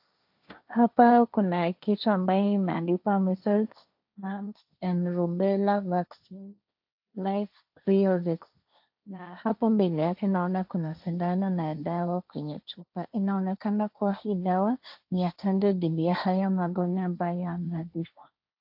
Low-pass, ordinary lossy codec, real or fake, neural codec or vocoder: 5.4 kHz; AAC, 48 kbps; fake; codec, 16 kHz, 1.1 kbps, Voila-Tokenizer